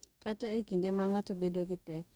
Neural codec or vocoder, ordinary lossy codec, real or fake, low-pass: codec, 44.1 kHz, 2.6 kbps, DAC; none; fake; none